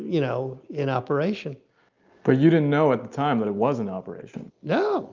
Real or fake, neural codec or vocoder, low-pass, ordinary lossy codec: real; none; 7.2 kHz; Opus, 24 kbps